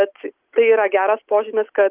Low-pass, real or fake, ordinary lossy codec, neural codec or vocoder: 3.6 kHz; real; Opus, 32 kbps; none